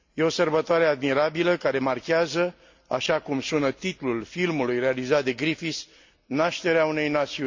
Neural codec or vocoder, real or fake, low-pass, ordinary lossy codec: none; real; 7.2 kHz; MP3, 48 kbps